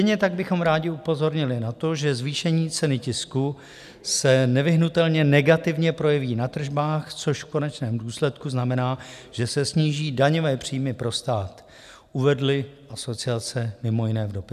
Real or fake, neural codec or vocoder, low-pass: real; none; 14.4 kHz